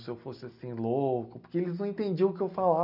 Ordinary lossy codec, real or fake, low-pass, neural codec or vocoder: none; real; 5.4 kHz; none